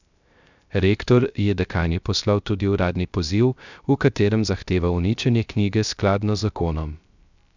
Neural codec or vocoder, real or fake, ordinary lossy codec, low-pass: codec, 16 kHz, 0.3 kbps, FocalCodec; fake; none; 7.2 kHz